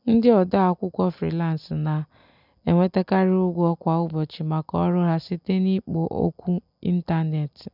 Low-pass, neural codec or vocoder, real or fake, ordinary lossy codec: 5.4 kHz; none; real; none